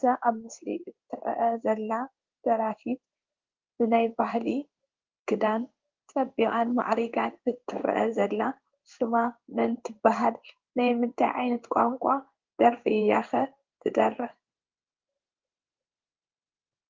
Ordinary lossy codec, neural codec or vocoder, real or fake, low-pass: Opus, 24 kbps; codec, 16 kHz in and 24 kHz out, 1 kbps, XY-Tokenizer; fake; 7.2 kHz